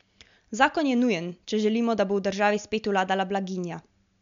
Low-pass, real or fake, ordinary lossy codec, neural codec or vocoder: 7.2 kHz; real; MP3, 64 kbps; none